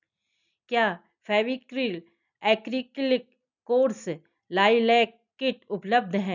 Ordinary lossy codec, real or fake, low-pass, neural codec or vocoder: none; real; 7.2 kHz; none